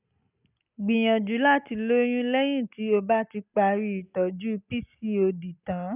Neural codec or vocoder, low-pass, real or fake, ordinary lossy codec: none; 3.6 kHz; real; none